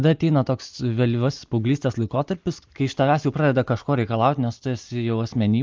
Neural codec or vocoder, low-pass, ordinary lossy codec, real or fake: none; 7.2 kHz; Opus, 32 kbps; real